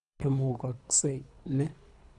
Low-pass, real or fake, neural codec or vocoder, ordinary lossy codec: 10.8 kHz; fake; codec, 24 kHz, 3 kbps, HILCodec; none